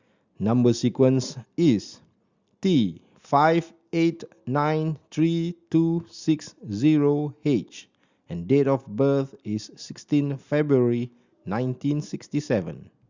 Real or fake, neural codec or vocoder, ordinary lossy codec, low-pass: real; none; Opus, 64 kbps; 7.2 kHz